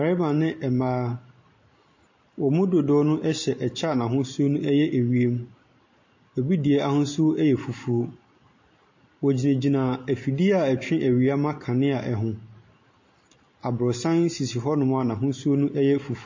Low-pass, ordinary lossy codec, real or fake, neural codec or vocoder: 7.2 kHz; MP3, 32 kbps; real; none